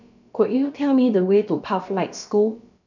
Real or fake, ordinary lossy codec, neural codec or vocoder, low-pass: fake; none; codec, 16 kHz, about 1 kbps, DyCAST, with the encoder's durations; 7.2 kHz